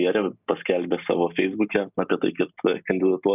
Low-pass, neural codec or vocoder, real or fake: 3.6 kHz; none; real